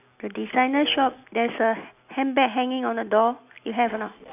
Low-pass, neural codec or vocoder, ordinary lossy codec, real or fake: 3.6 kHz; none; none; real